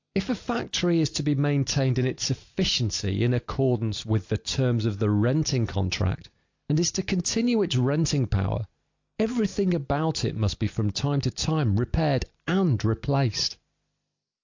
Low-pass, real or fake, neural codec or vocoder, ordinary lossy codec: 7.2 kHz; real; none; AAC, 48 kbps